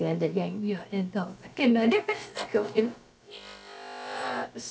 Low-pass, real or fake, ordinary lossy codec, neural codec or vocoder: none; fake; none; codec, 16 kHz, about 1 kbps, DyCAST, with the encoder's durations